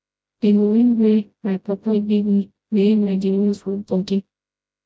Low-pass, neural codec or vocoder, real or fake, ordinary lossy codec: none; codec, 16 kHz, 0.5 kbps, FreqCodec, smaller model; fake; none